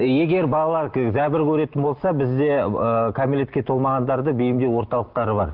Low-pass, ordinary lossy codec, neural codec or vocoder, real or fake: 5.4 kHz; Opus, 16 kbps; none; real